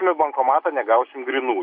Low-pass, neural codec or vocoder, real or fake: 5.4 kHz; none; real